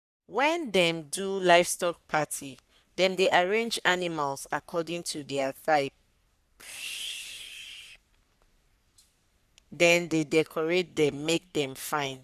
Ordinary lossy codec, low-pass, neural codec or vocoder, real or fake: none; 14.4 kHz; codec, 44.1 kHz, 3.4 kbps, Pupu-Codec; fake